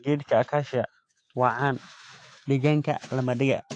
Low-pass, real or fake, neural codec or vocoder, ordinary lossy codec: 9.9 kHz; fake; autoencoder, 48 kHz, 128 numbers a frame, DAC-VAE, trained on Japanese speech; AAC, 48 kbps